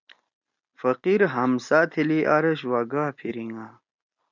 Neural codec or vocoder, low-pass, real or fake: none; 7.2 kHz; real